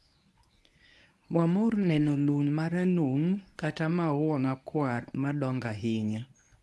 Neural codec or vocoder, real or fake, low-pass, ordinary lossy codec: codec, 24 kHz, 0.9 kbps, WavTokenizer, medium speech release version 1; fake; none; none